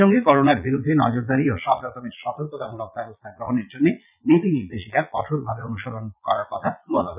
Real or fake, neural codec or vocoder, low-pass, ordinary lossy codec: fake; vocoder, 22.05 kHz, 80 mel bands, Vocos; 3.6 kHz; none